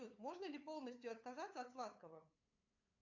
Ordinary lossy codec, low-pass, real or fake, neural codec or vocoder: AAC, 48 kbps; 7.2 kHz; fake; codec, 16 kHz, 8 kbps, FunCodec, trained on LibriTTS, 25 frames a second